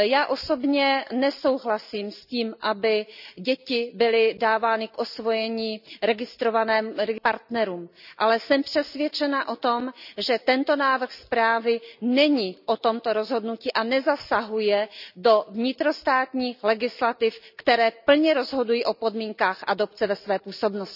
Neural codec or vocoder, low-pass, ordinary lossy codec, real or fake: none; 5.4 kHz; none; real